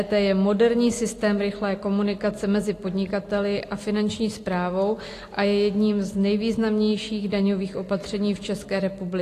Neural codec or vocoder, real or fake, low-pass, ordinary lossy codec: none; real; 14.4 kHz; AAC, 48 kbps